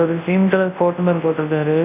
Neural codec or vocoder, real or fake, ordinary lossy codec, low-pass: codec, 24 kHz, 0.9 kbps, WavTokenizer, large speech release; fake; none; 3.6 kHz